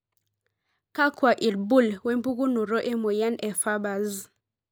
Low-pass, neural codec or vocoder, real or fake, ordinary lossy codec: none; none; real; none